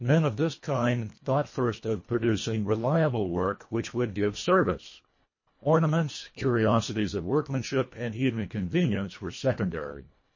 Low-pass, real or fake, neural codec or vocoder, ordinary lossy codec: 7.2 kHz; fake; codec, 24 kHz, 1.5 kbps, HILCodec; MP3, 32 kbps